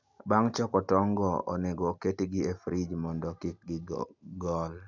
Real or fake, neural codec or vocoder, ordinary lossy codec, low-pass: real; none; none; 7.2 kHz